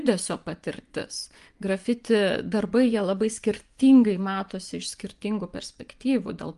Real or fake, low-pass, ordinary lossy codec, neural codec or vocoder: fake; 10.8 kHz; Opus, 32 kbps; vocoder, 24 kHz, 100 mel bands, Vocos